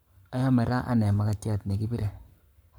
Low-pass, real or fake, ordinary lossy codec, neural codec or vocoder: none; fake; none; codec, 44.1 kHz, 7.8 kbps, Pupu-Codec